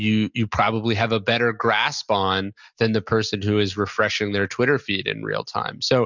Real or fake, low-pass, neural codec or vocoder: real; 7.2 kHz; none